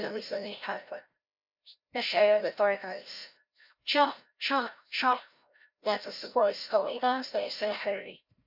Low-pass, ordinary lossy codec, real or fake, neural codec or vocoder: 5.4 kHz; MP3, 48 kbps; fake; codec, 16 kHz, 0.5 kbps, FreqCodec, larger model